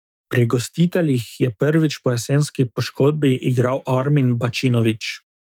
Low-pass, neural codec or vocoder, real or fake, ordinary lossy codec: 19.8 kHz; codec, 44.1 kHz, 7.8 kbps, DAC; fake; none